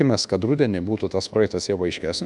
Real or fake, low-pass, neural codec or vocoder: fake; 10.8 kHz; codec, 24 kHz, 1.2 kbps, DualCodec